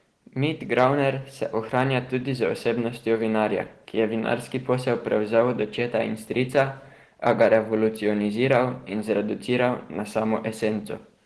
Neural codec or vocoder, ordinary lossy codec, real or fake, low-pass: none; Opus, 16 kbps; real; 10.8 kHz